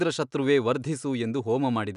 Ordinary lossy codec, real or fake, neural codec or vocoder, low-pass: none; real; none; 10.8 kHz